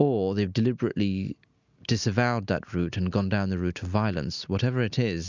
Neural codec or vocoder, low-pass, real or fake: none; 7.2 kHz; real